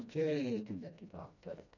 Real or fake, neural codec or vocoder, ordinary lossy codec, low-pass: fake; codec, 16 kHz, 1 kbps, FreqCodec, smaller model; none; 7.2 kHz